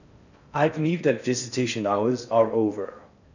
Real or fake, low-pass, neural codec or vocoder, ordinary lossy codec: fake; 7.2 kHz; codec, 16 kHz in and 24 kHz out, 0.6 kbps, FocalCodec, streaming, 4096 codes; none